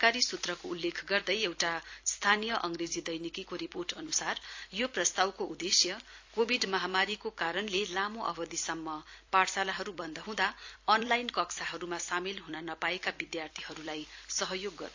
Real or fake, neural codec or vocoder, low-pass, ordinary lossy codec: fake; vocoder, 44.1 kHz, 128 mel bands every 256 samples, BigVGAN v2; 7.2 kHz; AAC, 48 kbps